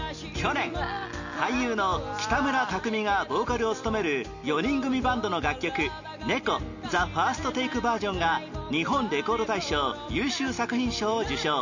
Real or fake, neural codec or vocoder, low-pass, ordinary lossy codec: real; none; 7.2 kHz; none